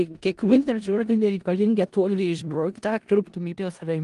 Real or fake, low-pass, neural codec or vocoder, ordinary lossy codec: fake; 10.8 kHz; codec, 16 kHz in and 24 kHz out, 0.4 kbps, LongCat-Audio-Codec, four codebook decoder; Opus, 24 kbps